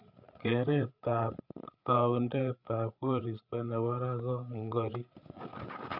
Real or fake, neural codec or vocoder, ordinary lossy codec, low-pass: fake; codec, 16 kHz, 8 kbps, FreqCodec, larger model; none; 5.4 kHz